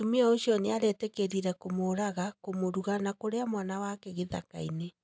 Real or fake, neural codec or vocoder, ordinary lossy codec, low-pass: real; none; none; none